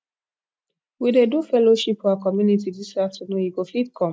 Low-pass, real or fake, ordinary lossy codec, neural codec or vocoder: none; real; none; none